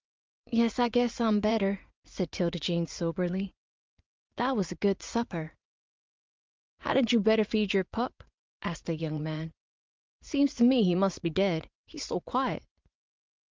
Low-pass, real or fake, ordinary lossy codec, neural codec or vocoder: 7.2 kHz; fake; Opus, 24 kbps; vocoder, 44.1 kHz, 80 mel bands, Vocos